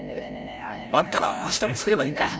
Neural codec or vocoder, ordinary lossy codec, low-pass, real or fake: codec, 16 kHz, 0.5 kbps, FreqCodec, larger model; none; none; fake